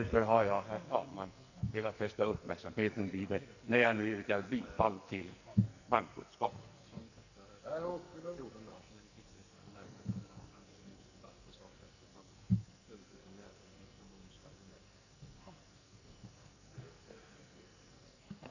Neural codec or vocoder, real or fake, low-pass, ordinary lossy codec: codec, 44.1 kHz, 2.6 kbps, SNAC; fake; 7.2 kHz; none